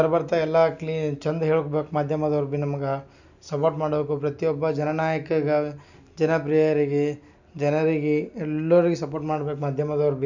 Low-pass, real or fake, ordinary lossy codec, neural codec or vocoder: 7.2 kHz; real; none; none